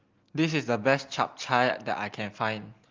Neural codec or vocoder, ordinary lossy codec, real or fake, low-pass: none; Opus, 32 kbps; real; 7.2 kHz